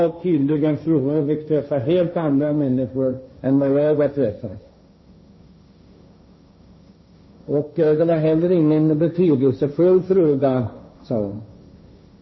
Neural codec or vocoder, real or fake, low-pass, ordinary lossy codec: codec, 16 kHz, 1.1 kbps, Voila-Tokenizer; fake; 7.2 kHz; MP3, 24 kbps